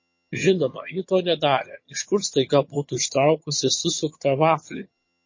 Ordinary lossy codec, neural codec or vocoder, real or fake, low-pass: MP3, 32 kbps; vocoder, 22.05 kHz, 80 mel bands, HiFi-GAN; fake; 7.2 kHz